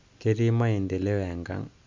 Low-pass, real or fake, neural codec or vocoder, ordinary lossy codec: 7.2 kHz; real; none; MP3, 64 kbps